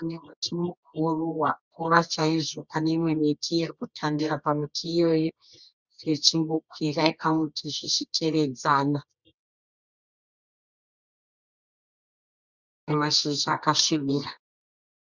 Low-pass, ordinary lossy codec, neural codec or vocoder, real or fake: 7.2 kHz; Opus, 64 kbps; codec, 24 kHz, 0.9 kbps, WavTokenizer, medium music audio release; fake